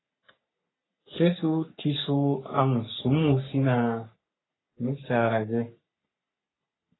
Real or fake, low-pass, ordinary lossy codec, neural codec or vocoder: fake; 7.2 kHz; AAC, 16 kbps; codec, 44.1 kHz, 3.4 kbps, Pupu-Codec